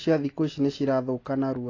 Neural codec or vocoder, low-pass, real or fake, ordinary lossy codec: none; 7.2 kHz; real; AAC, 32 kbps